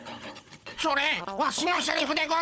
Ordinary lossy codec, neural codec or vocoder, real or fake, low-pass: none; codec, 16 kHz, 16 kbps, FunCodec, trained on Chinese and English, 50 frames a second; fake; none